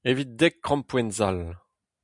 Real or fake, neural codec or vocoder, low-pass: real; none; 10.8 kHz